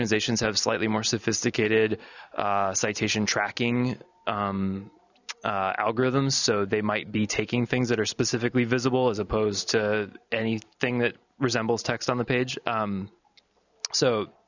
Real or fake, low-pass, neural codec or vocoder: real; 7.2 kHz; none